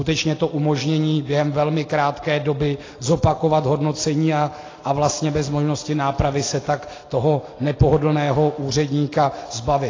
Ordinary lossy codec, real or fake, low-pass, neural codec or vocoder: AAC, 32 kbps; real; 7.2 kHz; none